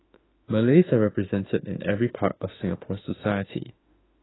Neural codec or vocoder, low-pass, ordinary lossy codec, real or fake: autoencoder, 48 kHz, 32 numbers a frame, DAC-VAE, trained on Japanese speech; 7.2 kHz; AAC, 16 kbps; fake